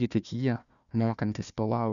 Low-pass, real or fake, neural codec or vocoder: 7.2 kHz; fake; codec, 16 kHz, 1 kbps, FunCodec, trained on Chinese and English, 50 frames a second